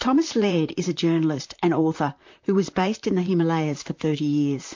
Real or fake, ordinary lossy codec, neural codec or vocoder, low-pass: fake; MP3, 48 kbps; vocoder, 44.1 kHz, 128 mel bands, Pupu-Vocoder; 7.2 kHz